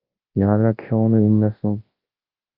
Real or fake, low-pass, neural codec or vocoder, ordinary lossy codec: fake; 5.4 kHz; codec, 24 kHz, 0.9 kbps, WavTokenizer, large speech release; Opus, 32 kbps